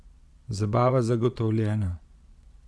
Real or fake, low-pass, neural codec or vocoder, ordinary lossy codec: fake; none; vocoder, 22.05 kHz, 80 mel bands, Vocos; none